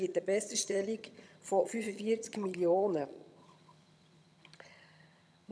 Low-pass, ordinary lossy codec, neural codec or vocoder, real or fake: none; none; vocoder, 22.05 kHz, 80 mel bands, HiFi-GAN; fake